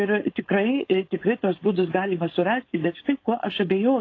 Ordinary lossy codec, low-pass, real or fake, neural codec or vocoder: AAC, 32 kbps; 7.2 kHz; fake; codec, 16 kHz, 4.8 kbps, FACodec